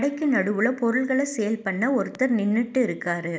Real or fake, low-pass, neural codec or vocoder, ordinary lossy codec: real; none; none; none